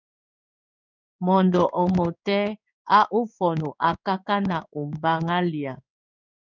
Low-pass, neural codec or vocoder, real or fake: 7.2 kHz; codec, 16 kHz in and 24 kHz out, 1 kbps, XY-Tokenizer; fake